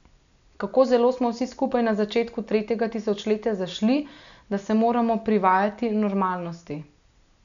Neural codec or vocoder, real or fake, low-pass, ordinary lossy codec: none; real; 7.2 kHz; none